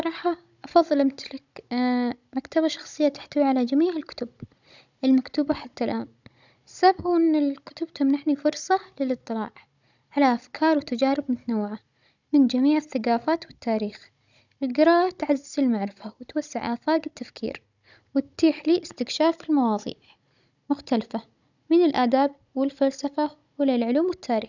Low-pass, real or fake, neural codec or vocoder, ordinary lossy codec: 7.2 kHz; fake; codec, 16 kHz, 16 kbps, FunCodec, trained on Chinese and English, 50 frames a second; none